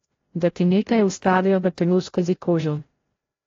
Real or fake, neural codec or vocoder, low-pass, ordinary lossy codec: fake; codec, 16 kHz, 0.5 kbps, FreqCodec, larger model; 7.2 kHz; AAC, 32 kbps